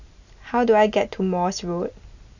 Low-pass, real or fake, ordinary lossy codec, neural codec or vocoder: 7.2 kHz; real; none; none